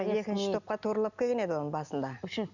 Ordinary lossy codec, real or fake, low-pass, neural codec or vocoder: none; real; 7.2 kHz; none